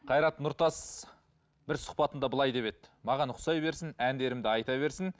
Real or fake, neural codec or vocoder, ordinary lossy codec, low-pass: real; none; none; none